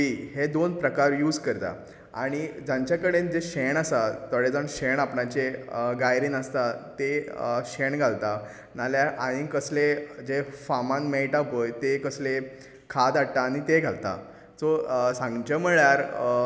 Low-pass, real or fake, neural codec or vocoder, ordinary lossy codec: none; real; none; none